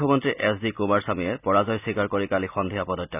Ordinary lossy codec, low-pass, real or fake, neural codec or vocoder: none; 3.6 kHz; real; none